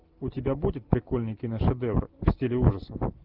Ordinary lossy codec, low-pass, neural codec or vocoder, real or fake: Opus, 32 kbps; 5.4 kHz; none; real